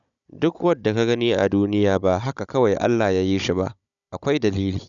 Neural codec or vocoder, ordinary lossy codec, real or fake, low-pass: codec, 16 kHz, 16 kbps, FunCodec, trained on Chinese and English, 50 frames a second; none; fake; 7.2 kHz